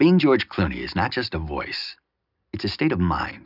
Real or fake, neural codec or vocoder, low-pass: real; none; 5.4 kHz